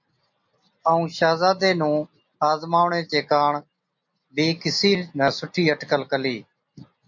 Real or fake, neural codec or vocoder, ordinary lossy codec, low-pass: real; none; MP3, 64 kbps; 7.2 kHz